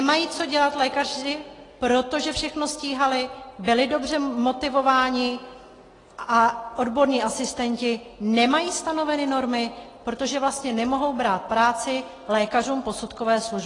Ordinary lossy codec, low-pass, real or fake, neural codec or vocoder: AAC, 32 kbps; 10.8 kHz; real; none